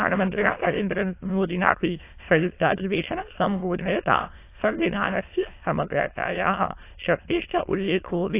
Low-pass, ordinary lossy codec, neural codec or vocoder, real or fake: 3.6 kHz; AAC, 24 kbps; autoencoder, 22.05 kHz, a latent of 192 numbers a frame, VITS, trained on many speakers; fake